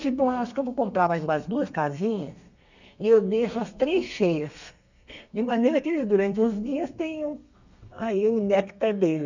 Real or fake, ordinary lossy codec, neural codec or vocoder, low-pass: fake; none; codec, 32 kHz, 1.9 kbps, SNAC; 7.2 kHz